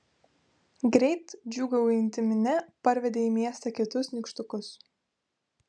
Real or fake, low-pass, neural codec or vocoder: real; 9.9 kHz; none